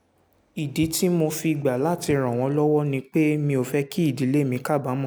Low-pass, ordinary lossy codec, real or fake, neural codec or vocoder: none; none; real; none